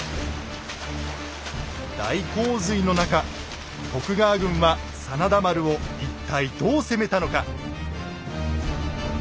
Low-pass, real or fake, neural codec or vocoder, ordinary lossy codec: none; real; none; none